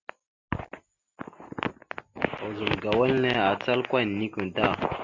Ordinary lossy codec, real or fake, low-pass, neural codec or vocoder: MP3, 64 kbps; real; 7.2 kHz; none